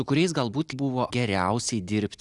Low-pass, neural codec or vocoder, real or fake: 10.8 kHz; none; real